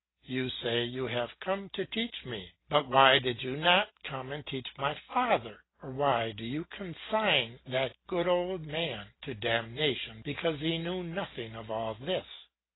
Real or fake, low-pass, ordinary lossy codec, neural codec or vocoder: real; 7.2 kHz; AAC, 16 kbps; none